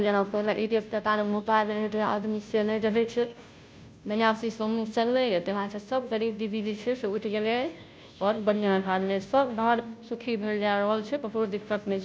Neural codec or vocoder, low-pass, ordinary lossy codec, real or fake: codec, 16 kHz, 0.5 kbps, FunCodec, trained on Chinese and English, 25 frames a second; none; none; fake